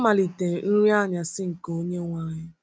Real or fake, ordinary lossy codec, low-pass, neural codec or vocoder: real; none; none; none